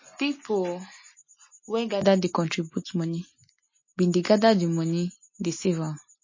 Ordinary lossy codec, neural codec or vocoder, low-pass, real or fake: MP3, 32 kbps; none; 7.2 kHz; real